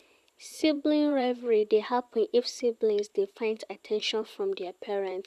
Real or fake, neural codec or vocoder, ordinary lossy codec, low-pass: fake; vocoder, 44.1 kHz, 128 mel bands, Pupu-Vocoder; none; 14.4 kHz